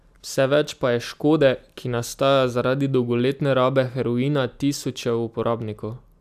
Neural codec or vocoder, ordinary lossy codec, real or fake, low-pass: none; none; real; 14.4 kHz